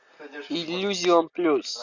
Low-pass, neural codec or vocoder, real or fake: 7.2 kHz; none; real